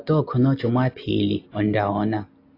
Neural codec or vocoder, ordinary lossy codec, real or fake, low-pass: none; AAC, 32 kbps; real; 5.4 kHz